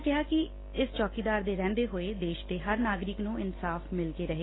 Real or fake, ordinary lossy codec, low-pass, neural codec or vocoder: real; AAC, 16 kbps; 7.2 kHz; none